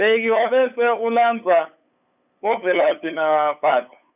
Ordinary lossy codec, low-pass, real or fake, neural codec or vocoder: none; 3.6 kHz; fake; codec, 16 kHz, 8 kbps, FunCodec, trained on LibriTTS, 25 frames a second